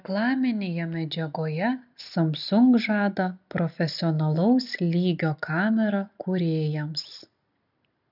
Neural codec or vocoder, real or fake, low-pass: none; real; 5.4 kHz